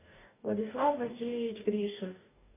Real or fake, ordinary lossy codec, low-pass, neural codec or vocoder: fake; MP3, 24 kbps; 3.6 kHz; codec, 44.1 kHz, 2.6 kbps, DAC